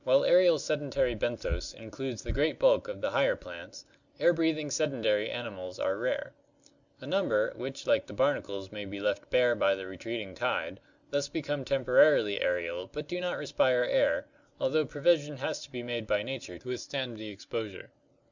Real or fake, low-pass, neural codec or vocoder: real; 7.2 kHz; none